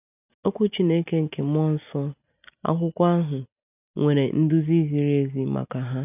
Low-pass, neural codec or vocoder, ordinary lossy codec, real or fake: 3.6 kHz; none; none; real